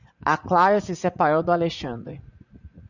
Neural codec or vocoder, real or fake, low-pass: vocoder, 44.1 kHz, 80 mel bands, Vocos; fake; 7.2 kHz